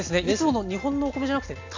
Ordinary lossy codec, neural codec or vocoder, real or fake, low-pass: AAC, 48 kbps; none; real; 7.2 kHz